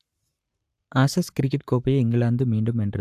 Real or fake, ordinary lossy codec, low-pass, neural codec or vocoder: fake; AAC, 96 kbps; 14.4 kHz; codec, 44.1 kHz, 7.8 kbps, Pupu-Codec